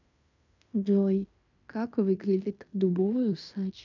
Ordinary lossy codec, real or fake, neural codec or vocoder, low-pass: none; fake; codec, 16 kHz in and 24 kHz out, 0.9 kbps, LongCat-Audio-Codec, fine tuned four codebook decoder; 7.2 kHz